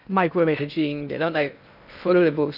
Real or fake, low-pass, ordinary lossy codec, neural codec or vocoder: fake; 5.4 kHz; none; codec, 16 kHz in and 24 kHz out, 0.6 kbps, FocalCodec, streaming, 2048 codes